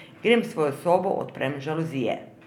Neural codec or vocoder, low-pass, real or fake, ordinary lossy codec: none; 19.8 kHz; real; none